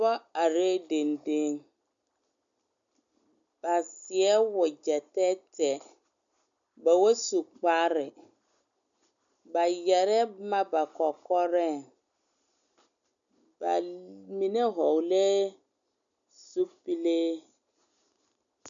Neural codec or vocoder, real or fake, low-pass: none; real; 7.2 kHz